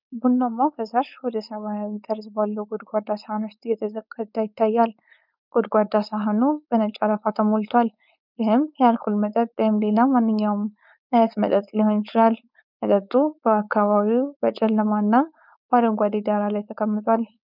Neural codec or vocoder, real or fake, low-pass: codec, 16 kHz, 4.8 kbps, FACodec; fake; 5.4 kHz